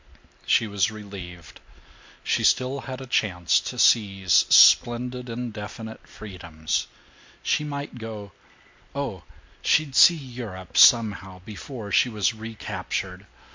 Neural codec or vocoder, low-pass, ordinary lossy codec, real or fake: none; 7.2 kHz; MP3, 48 kbps; real